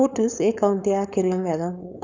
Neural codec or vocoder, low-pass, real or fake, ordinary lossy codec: codec, 16 kHz, 4.8 kbps, FACodec; 7.2 kHz; fake; none